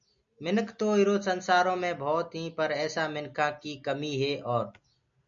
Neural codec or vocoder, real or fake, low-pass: none; real; 7.2 kHz